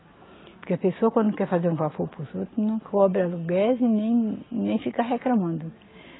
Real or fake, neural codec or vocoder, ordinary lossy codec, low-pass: real; none; AAC, 16 kbps; 7.2 kHz